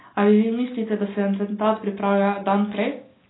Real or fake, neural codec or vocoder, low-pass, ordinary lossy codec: real; none; 7.2 kHz; AAC, 16 kbps